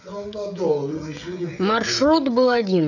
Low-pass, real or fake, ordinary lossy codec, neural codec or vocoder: 7.2 kHz; fake; none; codec, 16 kHz, 16 kbps, FreqCodec, smaller model